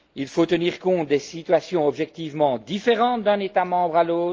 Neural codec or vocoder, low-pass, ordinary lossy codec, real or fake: none; 7.2 kHz; Opus, 24 kbps; real